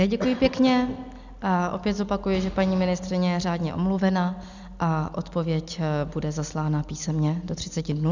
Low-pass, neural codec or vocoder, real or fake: 7.2 kHz; none; real